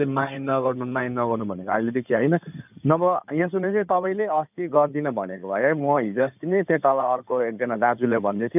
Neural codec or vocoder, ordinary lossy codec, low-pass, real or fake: codec, 16 kHz in and 24 kHz out, 2.2 kbps, FireRedTTS-2 codec; none; 3.6 kHz; fake